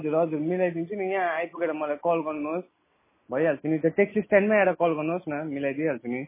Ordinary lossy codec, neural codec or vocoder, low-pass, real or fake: MP3, 16 kbps; none; 3.6 kHz; real